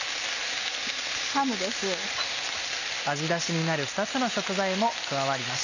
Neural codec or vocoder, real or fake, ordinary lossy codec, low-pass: none; real; none; 7.2 kHz